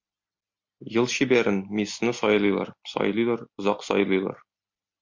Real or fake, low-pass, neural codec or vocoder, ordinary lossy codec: real; 7.2 kHz; none; MP3, 48 kbps